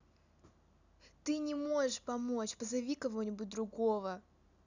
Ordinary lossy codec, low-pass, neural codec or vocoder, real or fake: none; 7.2 kHz; none; real